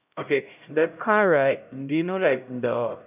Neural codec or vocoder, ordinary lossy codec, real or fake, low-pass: codec, 16 kHz, 0.5 kbps, X-Codec, HuBERT features, trained on LibriSpeech; none; fake; 3.6 kHz